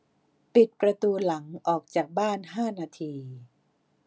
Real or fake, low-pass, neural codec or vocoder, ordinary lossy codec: real; none; none; none